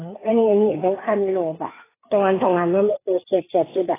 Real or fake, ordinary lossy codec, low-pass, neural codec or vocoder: fake; AAC, 16 kbps; 3.6 kHz; codec, 16 kHz, 4 kbps, FreqCodec, larger model